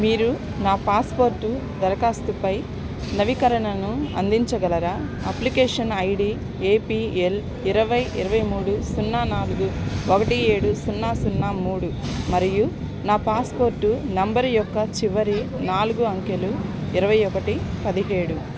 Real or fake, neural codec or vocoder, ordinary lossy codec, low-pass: real; none; none; none